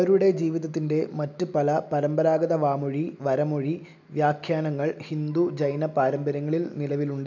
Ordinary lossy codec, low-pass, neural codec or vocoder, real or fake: none; 7.2 kHz; none; real